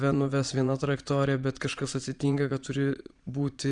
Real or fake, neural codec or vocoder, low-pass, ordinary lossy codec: fake; vocoder, 22.05 kHz, 80 mel bands, Vocos; 9.9 kHz; Opus, 64 kbps